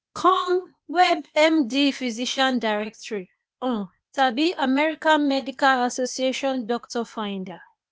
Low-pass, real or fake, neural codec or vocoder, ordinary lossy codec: none; fake; codec, 16 kHz, 0.8 kbps, ZipCodec; none